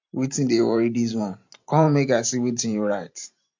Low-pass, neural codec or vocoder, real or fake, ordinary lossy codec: 7.2 kHz; vocoder, 44.1 kHz, 128 mel bands every 512 samples, BigVGAN v2; fake; MP3, 48 kbps